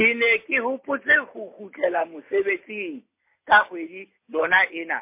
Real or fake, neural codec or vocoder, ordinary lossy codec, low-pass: real; none; MP3, 24 kbps; 3.6 kHz